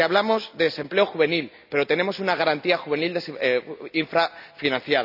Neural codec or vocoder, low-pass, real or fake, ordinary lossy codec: none; 5.4 kHz; real; none